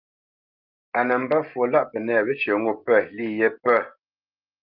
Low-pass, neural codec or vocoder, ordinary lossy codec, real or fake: 5.4 kHz; none; Opus, 24 kbps; real